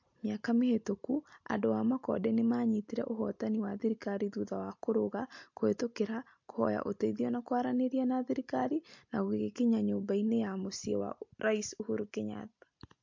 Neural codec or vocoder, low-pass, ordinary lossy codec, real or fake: none; 7.2 kHz; MP3, 48 kbps; real